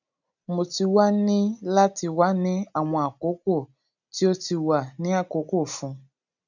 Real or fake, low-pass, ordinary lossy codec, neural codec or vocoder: real; 7.2 kHz; none; none